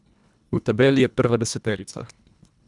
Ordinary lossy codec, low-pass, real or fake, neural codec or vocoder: none; 10.8 kHz; fake; codec, 24 kHz, 1.5 kbps, HILCodec